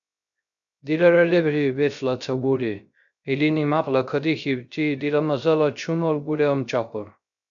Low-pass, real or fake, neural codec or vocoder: 7.2 kHz; fake; codec, 16 kHz, 0.3 kbps, FocalCodec